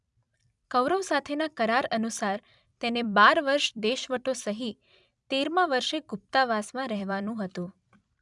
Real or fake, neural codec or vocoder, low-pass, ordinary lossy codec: fake; vocoder, 44.1 kHz, 128 mel bands every 256 samples, BigVGAN v2; 10.8 kHz; none